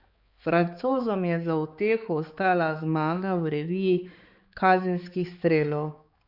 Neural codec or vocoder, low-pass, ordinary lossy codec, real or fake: codec, 16 kHz, 4 kbps, X-Codec, HuBERT features, trained on balanced general audio; 5.4 kHz; Opus, 64 kbps; fake